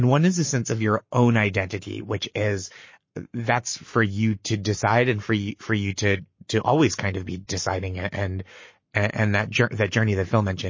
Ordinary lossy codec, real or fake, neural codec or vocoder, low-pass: MP3, 32 kbps; fake; codec, 44.1 kHz, 7.8 kbps, Pupu-Codec; 7.2 kHz